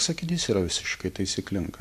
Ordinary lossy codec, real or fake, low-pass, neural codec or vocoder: AAC, 96 kbps; real; 14.4 kHz; none